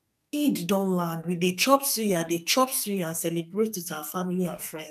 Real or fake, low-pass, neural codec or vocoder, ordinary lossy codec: fake; 14.4 kHz; codec, 44.1 kHz, 2.6 kbps, SNAC; none